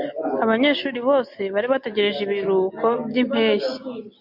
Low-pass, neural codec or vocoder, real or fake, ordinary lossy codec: 5.4 kHz; none; real; MP3, 48 kbps